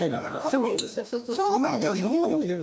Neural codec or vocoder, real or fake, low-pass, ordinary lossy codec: codec, 16 kHz, 1 kbps, FreqCodec, larger model; fake; none; none